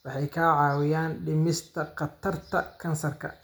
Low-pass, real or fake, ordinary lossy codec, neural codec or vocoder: none; real; none; none